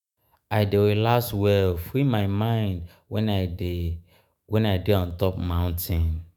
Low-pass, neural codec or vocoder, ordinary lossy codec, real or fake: none; autoencoder, 48 kHz, 128 numbers a frame, DAC-VAE, trained on Japanese speech; none; fake